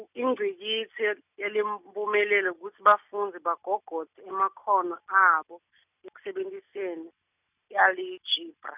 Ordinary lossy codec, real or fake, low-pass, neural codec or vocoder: none; real; 3.6 kHz; none